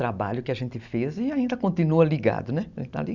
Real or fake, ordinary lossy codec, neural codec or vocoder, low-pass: real; none; none; 7.2 kHz